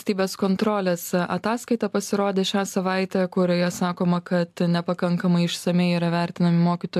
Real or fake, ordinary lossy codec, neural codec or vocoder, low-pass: real; AAC, 96 kbps; none; 14.4 kHz